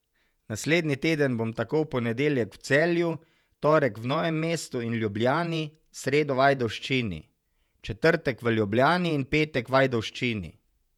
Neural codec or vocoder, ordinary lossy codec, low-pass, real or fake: vocoder, 48 kHz, 128 mel bands, Vocos; none; 19.8 kHz; fake